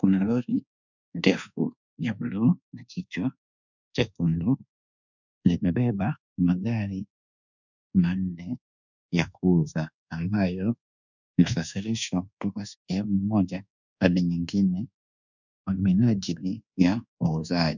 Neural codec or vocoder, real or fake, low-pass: codec, 24 kHz, 1.2 kbps, DualCodec; fake; 7.2 kHz